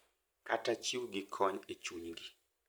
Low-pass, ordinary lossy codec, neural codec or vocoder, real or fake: none; none; none; real